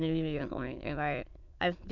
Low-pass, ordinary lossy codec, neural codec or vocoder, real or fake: 7.2 kHz; none; autoencoder, 22.05 kHz, a latent of 192 numbers a frame, VITS, trained on many speakers; fake